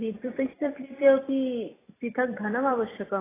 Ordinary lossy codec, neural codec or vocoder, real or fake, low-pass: AAC, 16 kbps; vocoder, 44.1 kHz, 128 mel bands every 256 samples, BigVGAN v2; fake; 3.6 kHz